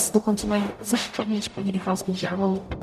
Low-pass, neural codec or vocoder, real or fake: 14.4 kHz; codec, 44.1 kHz, 0.9 kbps, DAC; fake